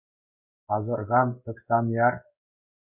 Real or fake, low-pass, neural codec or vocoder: fake; 3.6 kHz; codec, 16 kHz in and 24 kHz out, 1 kbps, XY-Tokenizer